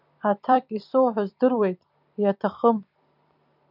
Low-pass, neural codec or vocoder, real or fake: 5.4 kHz; vocoder, 44.1 kHz, 128 mel bands every 256 samples, BigVGAN v2; fake